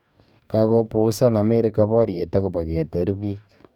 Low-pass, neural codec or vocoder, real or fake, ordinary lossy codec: 19.8 kHz; codec, 44.1 kHz, 2.6 kbps, DAC; fake; none